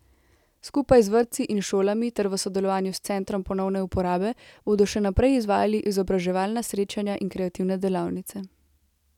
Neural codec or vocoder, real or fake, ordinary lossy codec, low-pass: none; real; none; 19.8 kHz